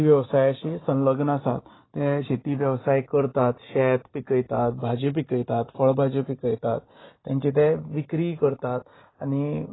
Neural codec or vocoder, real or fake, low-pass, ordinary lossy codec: none; real; 7.2 kHz; AAC, 16 kbps